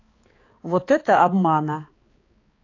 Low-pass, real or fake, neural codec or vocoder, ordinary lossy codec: 7.2 kHz; fake; codec, 16 kHz, 4 kbps, X-Codec, HuBERT features, trained on balanced general audio; AAC, 32 kbps